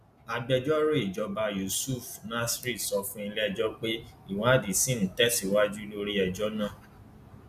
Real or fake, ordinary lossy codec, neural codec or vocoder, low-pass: real; none; none; 14.4 kHz